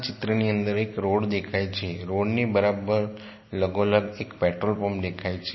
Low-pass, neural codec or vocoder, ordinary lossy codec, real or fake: 7.2 kHz; none; MP3, 24 kbps; real